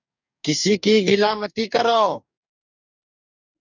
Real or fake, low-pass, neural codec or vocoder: fake; 7.2 kHz; codec, 44.1 kHz, 2.6 kbps, DAC